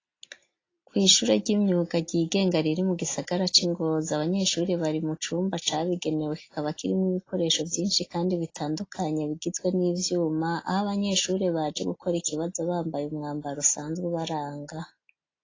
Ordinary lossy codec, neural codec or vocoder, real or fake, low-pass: AAC, 32 kbps; none; real; 7.2 kHz